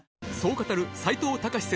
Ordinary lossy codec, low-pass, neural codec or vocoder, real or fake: none; none; none; real